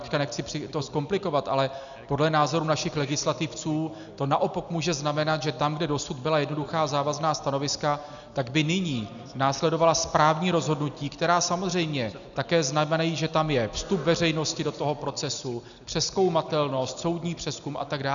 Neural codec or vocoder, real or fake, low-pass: none; real; 7.2 kHz